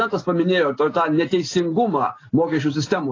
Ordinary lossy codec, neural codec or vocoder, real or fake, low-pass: AAC, 32 kbps; none; real; 7.2 kHz